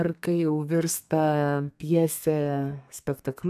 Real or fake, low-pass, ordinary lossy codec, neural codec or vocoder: fake; 14.4 kHz; AAC, 96 kbps; codec, 32 kHz, 1.9 kbps, SNAC